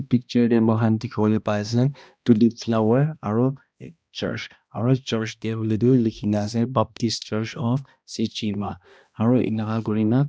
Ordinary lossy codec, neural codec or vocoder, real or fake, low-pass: none; codec, 16 kHz, 1 kbps, X-Codec, HuBERT features, trained on balanced general audio; fake; none